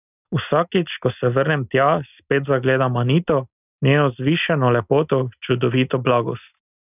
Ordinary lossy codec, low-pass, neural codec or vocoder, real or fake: none; 3.6 kHz; none; real